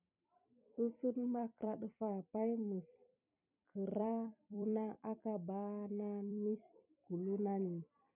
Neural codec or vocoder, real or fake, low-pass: vocoder, 44.1 kHz, 128 mel bands every 256 samples, BigVGAN v2; fake; 3.6 kHz